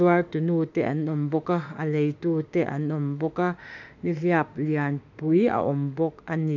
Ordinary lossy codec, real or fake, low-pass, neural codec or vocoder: none; fake; 7.2 kHz; autoencoder, 48 kHz, 32 numbers a frame, DAC-VAE, trained on Japanese speech